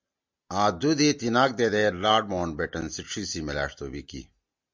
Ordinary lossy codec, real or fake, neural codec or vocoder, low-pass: MP3, 64 kbps; real; none; 7.2 kHz